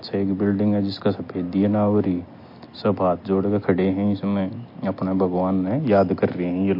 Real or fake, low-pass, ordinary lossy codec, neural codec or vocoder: real; 5.4 kHz; MP3, 32 kbps; none